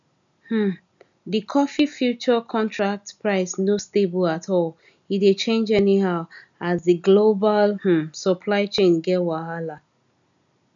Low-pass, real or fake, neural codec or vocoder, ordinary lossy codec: 7.2 kHz; real; none; none